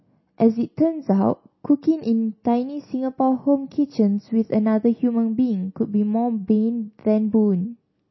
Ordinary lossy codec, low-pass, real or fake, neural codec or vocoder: MP3, 24 kbps; 7.2 kHz; real; none